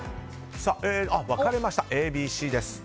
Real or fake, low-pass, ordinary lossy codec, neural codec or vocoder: real; none; none; none